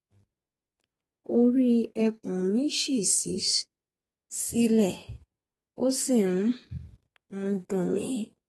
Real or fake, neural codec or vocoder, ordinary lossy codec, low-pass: fake; codec, 32 kHz, 1.9 kbps, SNAC; AAC, 32 kbps; 14.4 kHz